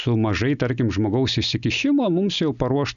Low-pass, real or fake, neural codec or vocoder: 7.2 kHz; real; none